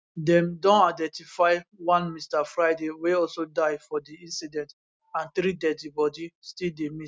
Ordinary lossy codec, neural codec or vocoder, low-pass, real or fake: none; none; none; real